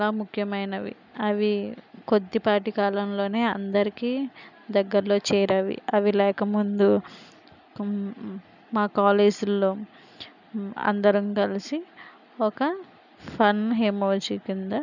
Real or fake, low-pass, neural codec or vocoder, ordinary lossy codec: real; 7.2 kHz; none; none